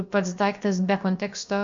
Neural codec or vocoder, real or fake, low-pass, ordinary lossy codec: codec, 16 kHz, about 1 kbps, DyCAST, with the encoder's durations; fake; 7.2 kHz; MP3, 48 kbps